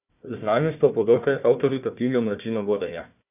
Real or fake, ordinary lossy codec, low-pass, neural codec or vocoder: fake; none; 3.6 kHz; codec, 16 kHz, 1 kbps, FunCodec, trained on Chinese and English, 50 frames a second